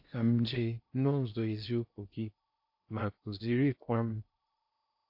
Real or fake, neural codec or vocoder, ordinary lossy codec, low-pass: fake; codec, 16 kHz in and 24 kHz out, 0.6 kbps, FocalCodec, streaming, 2048 codes; AAC, 48 kbps; 5.4 kHz